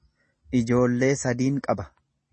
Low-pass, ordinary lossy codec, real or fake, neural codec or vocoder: 10.8 kHz; MP3, 32 kbps; real; none